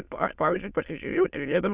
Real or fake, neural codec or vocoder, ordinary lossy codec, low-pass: fake; autoencoder, 22.05 kHz, a latent of 192 numbers a frame, VITS, trained on many speakers; Opus, 64 kbps; 3.6 kHz